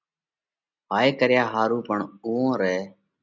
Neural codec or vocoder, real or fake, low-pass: none; real; 7.2 kHz